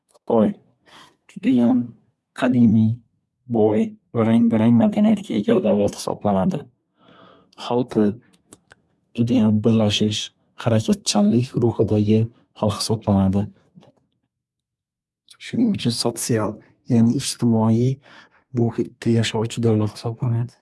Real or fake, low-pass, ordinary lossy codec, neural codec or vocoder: fake; none; none; codec, 24 kHz, 1 kbps, SNAC